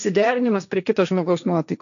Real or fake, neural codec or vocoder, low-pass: fake; codec, 16 kHz, 1.1 kbps, Voila-Tokenizer; 7.2 kHz